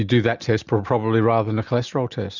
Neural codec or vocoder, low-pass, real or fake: none; 7.2 kHz; real